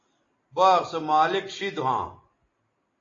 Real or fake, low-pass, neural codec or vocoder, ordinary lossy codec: real; 7.2 kHz; none; AAC, 32 kbps